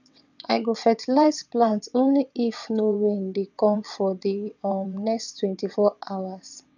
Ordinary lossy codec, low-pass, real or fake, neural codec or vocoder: none; 7.2 kHz; fake; vocoder, 22.05 kHz, 80 mel bands, WaveNeXt